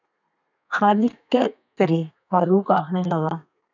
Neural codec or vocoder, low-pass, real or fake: codec, 32 kHz, 1.9 kbps, SNAC; 7.2 kHz; fake